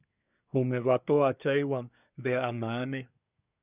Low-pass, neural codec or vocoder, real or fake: 3.6 kHz; codec, 24 kHz, 1 kbps, SNAC; fake